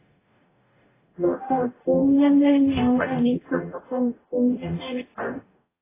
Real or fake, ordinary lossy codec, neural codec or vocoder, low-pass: fake; AAC, 16 kbps; codec, 44.1 kHz, 0.9 kbps, DAC; 3.6 kHz